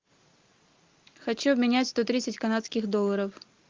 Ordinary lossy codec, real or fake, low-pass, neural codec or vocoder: Opus, 24 kbps; real; 7.2 kHz; none